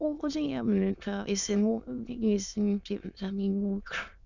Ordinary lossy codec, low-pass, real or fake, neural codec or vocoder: none; 7.2 kHz; fake; autoencoder, 22.05 kHz, a latent of 192 numbers a frame, VITS, trained on many speakers